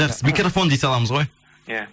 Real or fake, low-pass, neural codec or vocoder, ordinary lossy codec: real; none; none; none